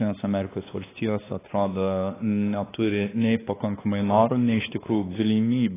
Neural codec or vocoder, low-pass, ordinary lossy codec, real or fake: codec, 16 kHz, 2 kbps, X-Codec, WavLM features, trained on Multilingual LibriSpeech; 3.6 kHz; AAC, 16 kbps; fake